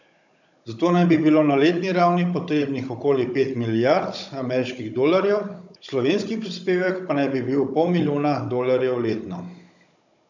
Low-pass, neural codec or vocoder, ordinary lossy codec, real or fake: 7.2 kHz; codec, 16 kHz, 16 kbps, FunCodec, trained on Chinese and English, 50 frames a second; none; fake